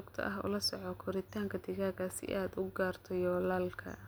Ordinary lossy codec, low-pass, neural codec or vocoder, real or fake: none; none; none; real